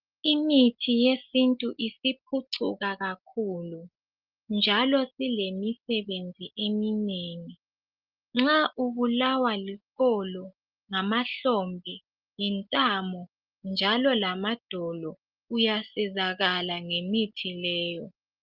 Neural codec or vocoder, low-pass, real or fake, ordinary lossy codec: none; 5.4 kHz; real; Opus, 32 kbps